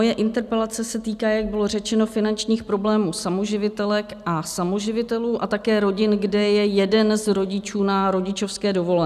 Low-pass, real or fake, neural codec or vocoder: 14.4 kHz; real; none